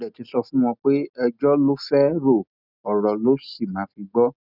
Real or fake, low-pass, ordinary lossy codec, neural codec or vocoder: real; 5.4 kHz; none; none